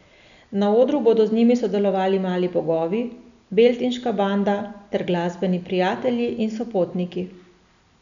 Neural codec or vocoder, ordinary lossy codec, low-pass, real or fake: none; none; 7.2 kHz; real